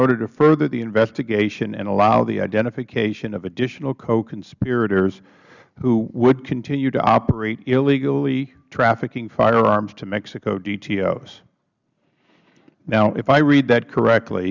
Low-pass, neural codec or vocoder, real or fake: 7.2 kHz; none; real